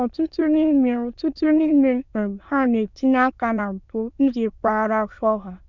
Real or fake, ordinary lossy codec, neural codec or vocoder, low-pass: fake; none; autoencoder, 22.05 kHz, a latent of 192 numbers a frame, VITS, trained on many speakers; 7.2 kHz